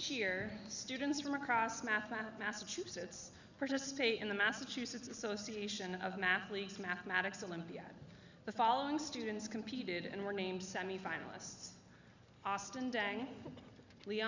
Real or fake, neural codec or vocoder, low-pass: real; none; 7.2 kHz